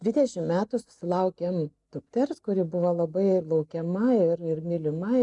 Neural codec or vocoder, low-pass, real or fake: none; 10.8 kHz; real